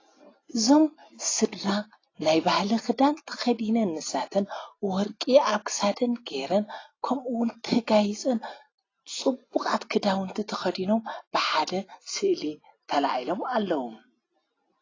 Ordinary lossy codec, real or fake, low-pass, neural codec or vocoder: AAC, 32 kbps; real; 7.2 kHz; none